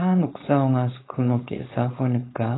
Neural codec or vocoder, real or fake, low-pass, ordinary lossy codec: codec, 16 kHz, 4.8 kbps, FACodec; fake; 7.2 kHz; AAC, 16 kbps